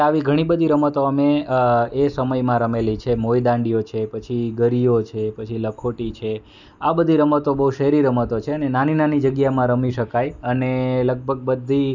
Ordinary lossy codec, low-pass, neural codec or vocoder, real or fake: none; 7.2 kHz; none; real